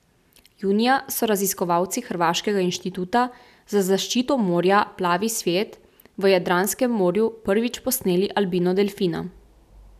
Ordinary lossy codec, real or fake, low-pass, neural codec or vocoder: none; real; 14.4 kHz; none